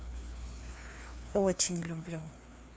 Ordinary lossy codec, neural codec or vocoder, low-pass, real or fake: none; codec, 16 kHz, 2 kbps, FreqCodec, larger model; none; fake